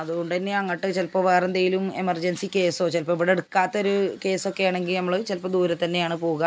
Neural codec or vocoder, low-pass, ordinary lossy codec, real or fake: none; none; none; real